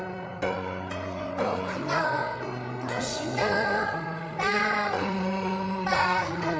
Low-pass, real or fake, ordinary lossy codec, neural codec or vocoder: none; fake; none; codec, 16 kHz, 8 kbps, FreqCodec, larger model